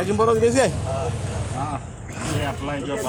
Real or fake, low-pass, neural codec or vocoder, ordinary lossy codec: fake; none; codec, 44.1 kHz, 7.8 kbps, Pupu-Codec; none